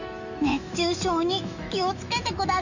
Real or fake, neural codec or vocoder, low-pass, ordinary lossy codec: fake; autoencoder, 48 kHz, 128 numbers a frame, DAC-VAE, trained on Japanese speech; 7.2 kHz; none